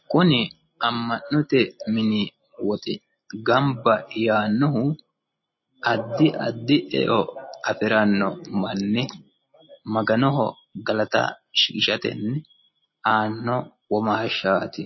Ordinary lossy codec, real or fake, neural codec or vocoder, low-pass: MP3, 24 kbps; real; none; 7.2 kHz